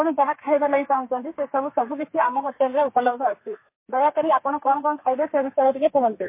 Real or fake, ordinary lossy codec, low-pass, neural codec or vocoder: fake; MP3, 24 kbps; 3.6 kHz; codec, 32 kHz, 1.9 kbps, SNAC